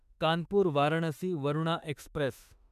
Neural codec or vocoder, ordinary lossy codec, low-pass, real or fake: autoencoder, 48 kHz, 32 numbers a frame, DAC-VAE, trained on Japanese speech; none; 14.4 kHz; fake